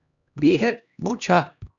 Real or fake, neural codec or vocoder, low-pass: fake; codec, 16 kHz, 1 kbps, X-Codec, HuBERT features, trained on LibriSpeech; 7.2 kHz